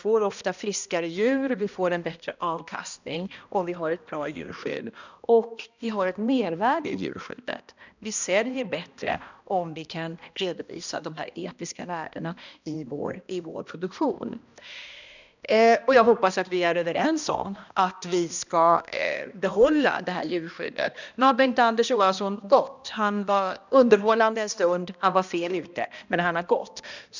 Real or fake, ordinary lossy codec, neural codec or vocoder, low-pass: fake; none; codec, 16 kHz, 1 kbps, X-Codec, HuBERT features, trained on balanced general audio; 7.2 kHz